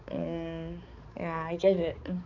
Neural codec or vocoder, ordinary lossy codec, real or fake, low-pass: codec, 16 kHz, 4 kbps, X-Codec, HuBERT features, trained on balanced general audio; none; fake; 7.2 kHz